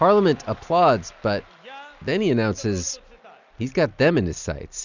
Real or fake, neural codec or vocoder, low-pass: real; none; 7.2 kHz